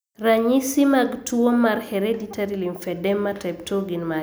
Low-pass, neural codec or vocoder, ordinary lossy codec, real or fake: none; none; none; real